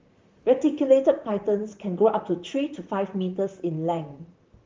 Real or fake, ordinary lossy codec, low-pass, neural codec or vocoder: fake; Opus, 32 kbps; 7.2 kHz; vocoder, 44.1 kHz, 128 mel bands, Pupu-Vocoder